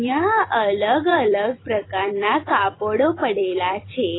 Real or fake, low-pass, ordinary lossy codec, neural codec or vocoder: fake; 7.2 kHz; AAC, 16 kbps; vocoder, 44.1 kHz, 128 mel bands every 256 samples, BigVGAN v2